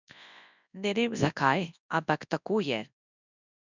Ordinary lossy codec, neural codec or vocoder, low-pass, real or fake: none; codec, 24 kHz, 0.9 kbps, WavTokenizer, large speech release; 7.2 kHz; fake